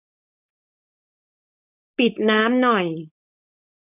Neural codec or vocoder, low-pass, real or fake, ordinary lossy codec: codec, 16 kHz, 4.8 kbps, FACodec; 3.6 kHz; fake; none